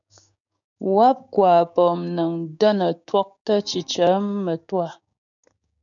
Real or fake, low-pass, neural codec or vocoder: fake; 7.2 kHz; codec, 16 kHz, 6 kbps, DAC